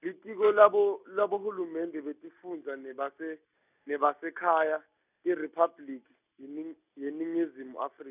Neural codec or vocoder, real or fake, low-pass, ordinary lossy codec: none; real; 3.6 kHz; none